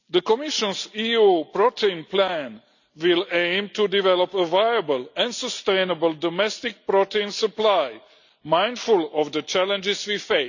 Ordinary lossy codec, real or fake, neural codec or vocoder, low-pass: none; real; none; 7.2 kHz